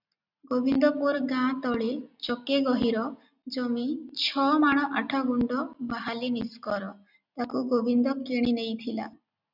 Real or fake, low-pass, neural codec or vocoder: real; 5.4 kHz; none